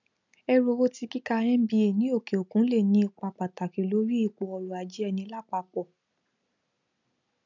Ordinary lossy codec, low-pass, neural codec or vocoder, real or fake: none; 7.2 kHz; none; real